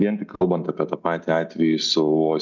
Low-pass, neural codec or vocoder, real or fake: 7.2 kHz; none; real